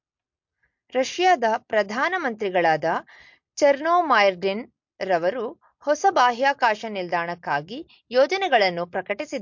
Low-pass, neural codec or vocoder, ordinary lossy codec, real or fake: 7.2 kHz; none; MP3, 48 kbps; real